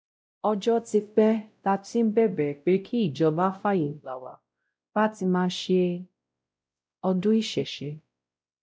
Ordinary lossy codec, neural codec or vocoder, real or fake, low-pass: none; codec, 16 kHz, 0.5 kbps, X-Codec, WavLM features, trained on Multilingual LibriSpeech; fake; none